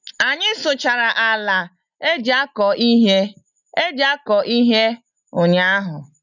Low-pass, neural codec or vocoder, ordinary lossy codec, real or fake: 7.2 kHz; none; none; real